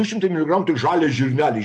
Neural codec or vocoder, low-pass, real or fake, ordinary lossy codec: none; 10.8 kHz; real; MP3, 48 kbps